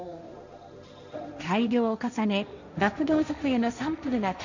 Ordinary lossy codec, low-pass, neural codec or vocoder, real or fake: none; 7.2 kHz; codec, 16 kHz, 1.1 kbps, Voila-Tokenizer; fake